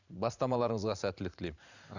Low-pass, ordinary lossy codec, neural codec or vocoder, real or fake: 7.2 kHz; none; vocoder, 44.1 kHz, 128 mel bands every 512 samples, BigVGAN v2; fake